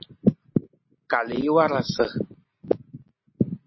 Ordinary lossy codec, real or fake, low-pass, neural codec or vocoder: MP3, 24 kbps; real; 7.2 kHz; none